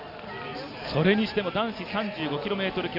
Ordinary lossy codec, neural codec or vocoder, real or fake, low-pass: none; none; real; 5.4 kHz